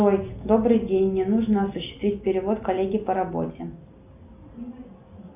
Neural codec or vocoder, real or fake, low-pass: none; real; 3.6 kHz